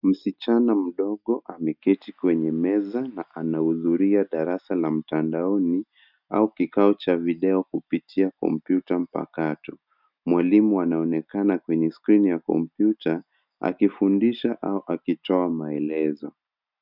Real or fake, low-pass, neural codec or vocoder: real; 5.4 kHz; none